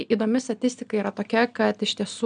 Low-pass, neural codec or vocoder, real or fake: 9.9 kHz; none; real